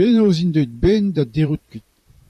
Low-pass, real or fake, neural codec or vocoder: 14.4 kHz; fake; vocoder, 44.1 kHz, 128 mel bands, Pupu-Vocoder